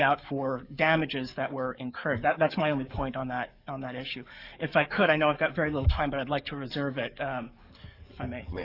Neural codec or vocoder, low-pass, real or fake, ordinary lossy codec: codec, 44.1 kHz, 7.8 kbps, DAC; 5.4 kHz; fake; Opus, 64 kbps